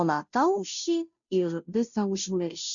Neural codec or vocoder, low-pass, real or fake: codec, 16 kHz, 0.5 kbps, FunCodec, trained on Chinese and English, 25 frames a second; 7.2 kHz; fake